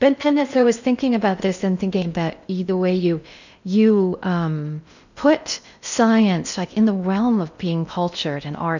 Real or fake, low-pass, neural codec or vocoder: fake; 7.2 kHz; codec, 16 kHz in and 24 kHz out, 0.6 kbps, FocalCodec, streaming, 4096 codes